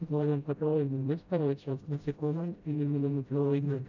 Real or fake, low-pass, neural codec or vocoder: fake; 7.2 kHz; codec, 16 kHz, 0.5 kbps, FreqCodec, smaller model